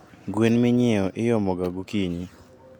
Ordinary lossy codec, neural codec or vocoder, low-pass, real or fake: none; none; 19.8 kHz; real